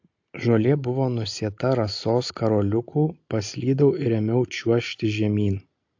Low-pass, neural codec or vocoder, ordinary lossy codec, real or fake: 7.2 kHz; none; AAC, 48 kbps; real